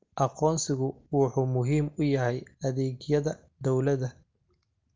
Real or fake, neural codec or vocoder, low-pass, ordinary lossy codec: real; none; 7.2 kHz; Opus, 32 kbps